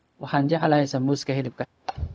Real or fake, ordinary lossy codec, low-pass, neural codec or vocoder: fake; none; none; codec, 16 kHz, 0.4 kbps, LongCat-Audio-Codec